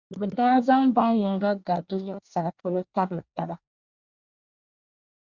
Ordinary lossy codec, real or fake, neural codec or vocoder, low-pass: Opus, 64 kbps; fake; codec, 24 kHz, 1 kbps, SNAC; 7.2 kHz